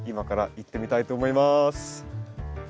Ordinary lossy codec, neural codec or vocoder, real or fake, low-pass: none; none; real; none